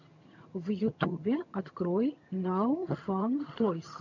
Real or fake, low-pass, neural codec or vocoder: fake; 7.2 kHz; vocoder, 22.05 kHz, 80 mel bands, HiFi-GAN